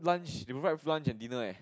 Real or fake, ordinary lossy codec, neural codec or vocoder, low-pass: real; none; none; none